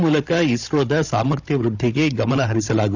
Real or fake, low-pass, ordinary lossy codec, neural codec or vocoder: fake; 7.2 kHz; none; codec, 16 kHz, 16 kbps, FunCodec, trained on Chinese and English, 50 frames a second